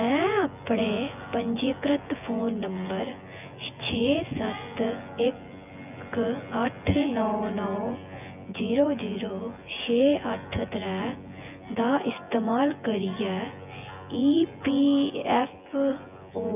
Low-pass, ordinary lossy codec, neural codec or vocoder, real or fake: 3.6 kHz; none; vocoder, 24 kHz, 100 mel bands, Vocos; fake